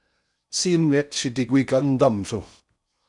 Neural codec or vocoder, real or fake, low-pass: codec, 16 kHz in and 24 kHz out, 0.6 kbps, FocalCodec, streaming, 4096 codes; fake; 10.8 kHz